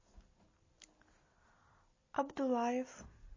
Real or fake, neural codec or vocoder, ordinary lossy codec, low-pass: real; none; MP3, 32 kbps; 7.2 kHz